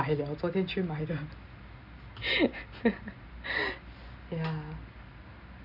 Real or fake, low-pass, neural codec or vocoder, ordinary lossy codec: real; 5.4 kHz; none; none